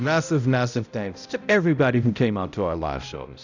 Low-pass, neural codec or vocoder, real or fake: 7.2 kHz; codec, 16 kHz, 0.5 kbps, X-Codec, HuBERT features, trained on balanced general audio; fake